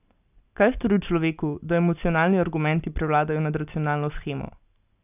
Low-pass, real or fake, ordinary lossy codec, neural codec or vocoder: 3.6 kHz; real; none; none